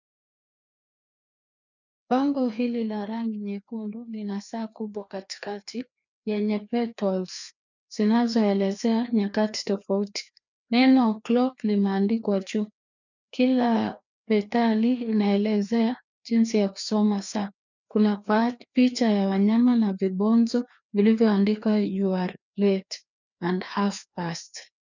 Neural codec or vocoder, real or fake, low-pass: codec, 16 kHz, 2 kbps, FreqCodec, larger model; fake; 7.2 kHz